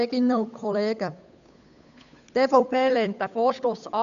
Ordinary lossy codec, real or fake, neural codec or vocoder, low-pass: none; fake; codec, 16 kHz, 8 kbps, FunCodec, trained on Chinese and English, 25 frames a second; 7.2 kHz